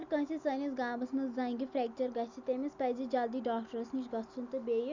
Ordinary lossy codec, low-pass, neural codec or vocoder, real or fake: none; 7.2 kHz; none; real